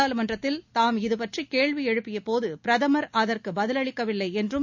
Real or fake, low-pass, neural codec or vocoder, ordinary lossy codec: real; 7.2 kHz; none; none